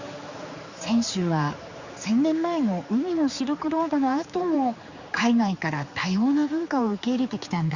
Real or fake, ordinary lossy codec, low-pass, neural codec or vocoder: fake; Opus, 64 kbps; 7.2 kHz; codec, 16 kHz, 4 kbps, X-Codec, HuBERT features, trained on general audio